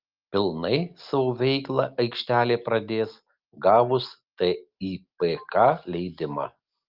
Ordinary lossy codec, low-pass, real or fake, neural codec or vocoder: Opus, 24 kbps; 5.4 kHz; real; none